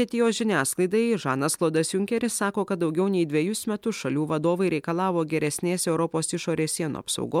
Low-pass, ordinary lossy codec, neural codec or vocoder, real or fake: 19.8 kHz; MP3, 96 kbps; none; real